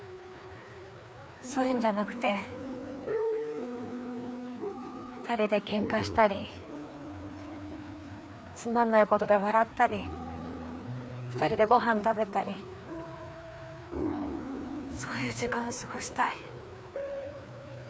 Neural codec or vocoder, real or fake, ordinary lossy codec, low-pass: codec, 16 kHz, 2 kbps, FreqCodec, larger model; fake; none; none